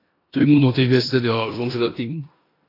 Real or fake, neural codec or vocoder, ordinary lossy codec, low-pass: fake; codec, 16 kHz in and 24 kHz out, 0.9 kbps, LongCat-Audio-Codec, four codebook decoder; AAC, 24 kbps; 5.4 kHz